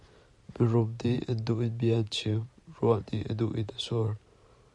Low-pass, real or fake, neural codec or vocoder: 10.8 kHz; fake; vocoder, 44.1 kHz, 128 mel bands every 512 samples, BigVGAN v2